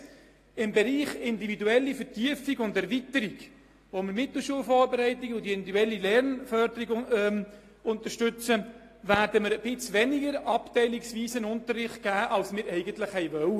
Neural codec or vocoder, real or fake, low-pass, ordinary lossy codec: none; real; 14.4 kHz; AAC, 48 kbps